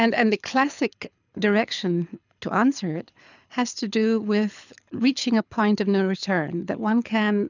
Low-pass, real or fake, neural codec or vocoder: 7.2 kHz; fake; codec, 24 kHz, 6 kbps, HILCodec